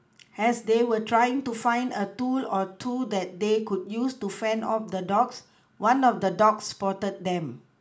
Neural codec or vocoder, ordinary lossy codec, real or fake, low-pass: none; none; real; none